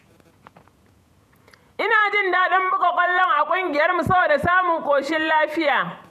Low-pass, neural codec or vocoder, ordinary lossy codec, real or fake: 14.4 kHz; vocoder, 48 kHz, 128 mel bands, Vocos; none; fake